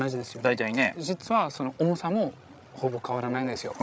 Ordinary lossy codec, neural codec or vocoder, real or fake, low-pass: none; codec, 16 kHz, 16 kbps, FreqCodec, larger model; fake; none